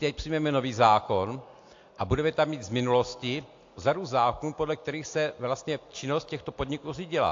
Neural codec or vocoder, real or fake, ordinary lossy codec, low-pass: none; real; AAC, 48 kbps; 7.2 kHz